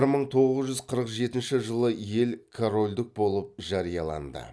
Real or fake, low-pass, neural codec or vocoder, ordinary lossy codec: real; none; none; none